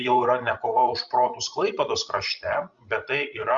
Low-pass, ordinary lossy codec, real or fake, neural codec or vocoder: 7.2 kHz; Opus, 64 kbps; fake; codec, 16 kHz, 16 kbps, FreqCodec, larger model